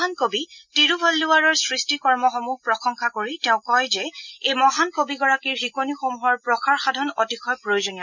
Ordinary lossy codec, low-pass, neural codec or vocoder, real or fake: none; 7.2 kHz; none; real